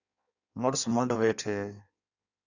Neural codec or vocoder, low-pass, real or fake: codec, 16 kHz in and 24 kHz out, 1.1 kbps, FireRedTTS-2 codec; 7.2 kHz; fake